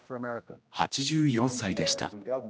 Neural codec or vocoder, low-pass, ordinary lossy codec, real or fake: codec, 16 kHz, 1 kbps, X-Codec, HuBERT features, trained on general audio; none; none; fake